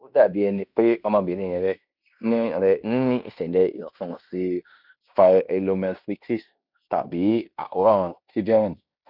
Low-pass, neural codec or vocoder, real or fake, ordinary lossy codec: 5.4 kHz; codec, 16 kHz in and 24 kHz out, 0.9 kbps, LongCat-Audio-Codec, fine tuned four codebook decoder; fake; none